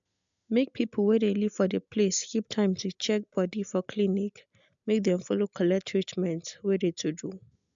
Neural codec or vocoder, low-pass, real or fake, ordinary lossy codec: none; 7.2 kHz; real; MP3, 64 kbps